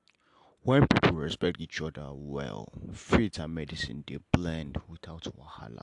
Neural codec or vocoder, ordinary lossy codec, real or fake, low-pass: none; AAC, 64 kbps; real; 10.8 kHz